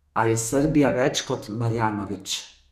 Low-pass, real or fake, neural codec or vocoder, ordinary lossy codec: 14.4 kHz; fake; codec, 32 kHz, 1.9 kbps, SNAC; none